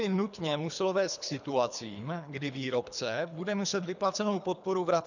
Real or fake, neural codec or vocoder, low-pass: fake; codec, 24 kHz, 3 kbps, HILCodec; 7.2 kHz